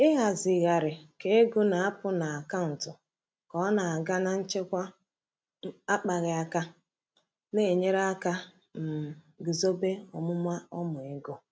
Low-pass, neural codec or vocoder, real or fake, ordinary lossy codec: none; none; real; none